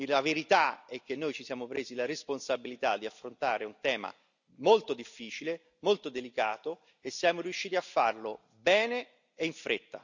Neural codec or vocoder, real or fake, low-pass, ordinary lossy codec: none; real; 7.2 kHz; none